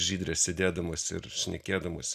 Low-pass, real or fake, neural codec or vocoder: 14.4 kHz; real; none